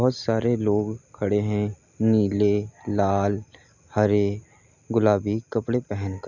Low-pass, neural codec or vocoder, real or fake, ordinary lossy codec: 7.2 kHz; none; real; none